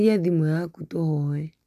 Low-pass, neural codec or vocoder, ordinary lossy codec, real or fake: 14.4 kHz; none; none; real